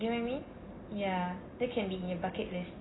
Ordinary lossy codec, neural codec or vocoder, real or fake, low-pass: AAC, 16 kbps; none; real; 7.2 kHz